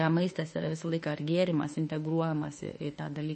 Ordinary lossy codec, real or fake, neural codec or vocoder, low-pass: MP3, 32 kbps; fake; autoencoder, 48 kHz, 32 numbers a frame, DAC-VAE, trained on Japanese speech; 10.8 kHz